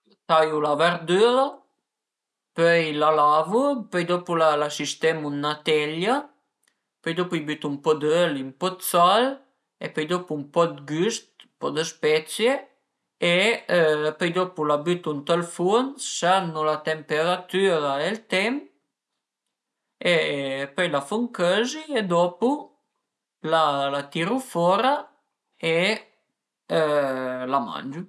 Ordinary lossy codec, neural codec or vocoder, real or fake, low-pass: none; none; real; none